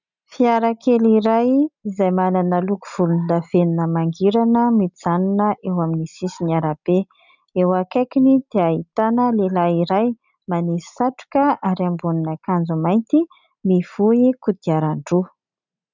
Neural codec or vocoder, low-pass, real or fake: none; 7.2 kHz; real